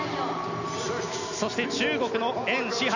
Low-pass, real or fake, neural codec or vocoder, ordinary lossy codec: 7.2 kHz; real; none; none